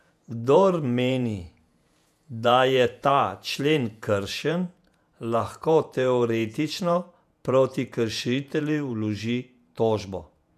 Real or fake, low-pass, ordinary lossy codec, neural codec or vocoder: fake; 14.4 kHz; none; vocoder, 48 kHz, 128 mel bands, Vocos